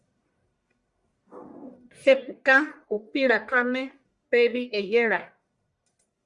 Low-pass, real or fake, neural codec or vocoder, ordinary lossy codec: 10.8 kHz; fake; codec, 44.1 kHz, 1.7 kbps, Pupu-Codec; Opus, 64 kbps